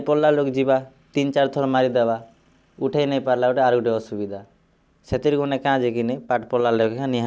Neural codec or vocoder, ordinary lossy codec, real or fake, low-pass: none; none; real; none